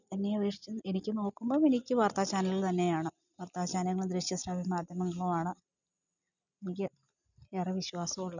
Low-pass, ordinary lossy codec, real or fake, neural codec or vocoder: 7.2 kHz; none; real; none